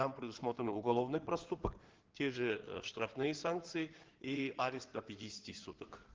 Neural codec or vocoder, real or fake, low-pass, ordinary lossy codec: codec, 16 kHz in and 24 kHz out, 2.2 kbps, FireRedTTS-2 codec; fake; 7.2 kHz; Opus, 16 kbps